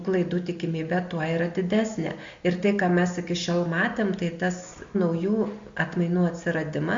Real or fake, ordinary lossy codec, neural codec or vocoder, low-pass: real; MP3, 48 kbps; none; 7.2 kHz